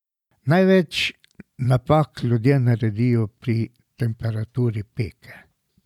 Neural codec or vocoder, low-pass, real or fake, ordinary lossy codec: vocoder, 44.1 kHz, 128 mel bands every 512 samples, BigVGAN v2; 19.8 kHz; fake; none